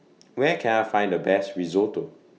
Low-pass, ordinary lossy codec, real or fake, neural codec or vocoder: none; none; real; none